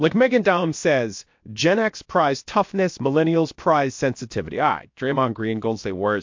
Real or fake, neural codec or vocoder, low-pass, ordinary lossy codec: fake; codec, 16 kHz, about 1 kbps, DyCAST, with the encoder's durations; 7.2 kHz; MP3, 48 kbps